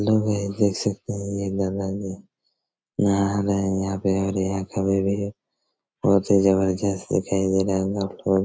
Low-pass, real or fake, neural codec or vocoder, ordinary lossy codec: none; real; none; none